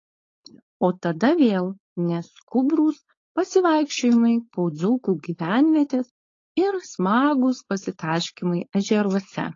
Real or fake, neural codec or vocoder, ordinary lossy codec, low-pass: fake; codec, 16 kHz, 4.8 kbps, FACodec; AAC, 32 kbps; 7.2 kHz